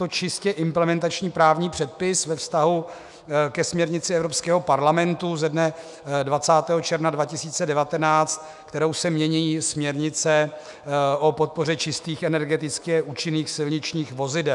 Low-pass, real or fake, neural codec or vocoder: 10.8 kHz; fake; autoencoder, 48 kHz, 128 numbers a frame, DAC-VAE, trained on Japanese speech